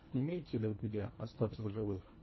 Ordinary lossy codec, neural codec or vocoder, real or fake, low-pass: MP3, 24 kbps; codec, 24 kHz, 1.5 kbps, HILCodec; fake; 7.2 kHz